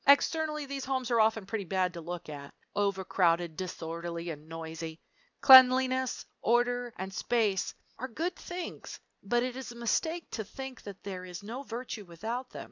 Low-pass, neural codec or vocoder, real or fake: 7.2 kHz; none; real